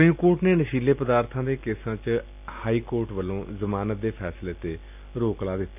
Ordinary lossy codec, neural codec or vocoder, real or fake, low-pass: AAC, 32 kbps; none; real; 3.6 kHz